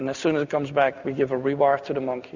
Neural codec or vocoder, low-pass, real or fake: none; 7.2 kHz; real